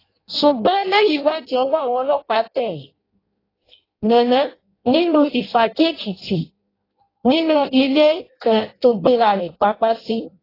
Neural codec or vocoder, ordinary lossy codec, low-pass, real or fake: codec, 16 kHz in and 24 kHz out, 0.6 kbps, FireRedTTS-2 codec; AAC, 24 kbps; 5.4 kHz; fake